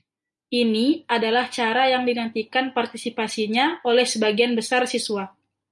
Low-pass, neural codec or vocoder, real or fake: 10.8 kHz; none; real